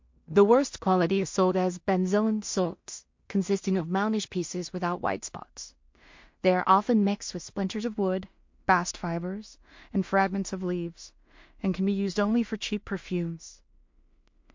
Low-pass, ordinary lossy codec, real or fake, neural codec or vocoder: 7.2 kHz; MP3, 48 kbps; fake; codec, 16 kHz in and 24 kHz out, 0.4 kbps, LongCat-Audio-Codec, two codebook decoder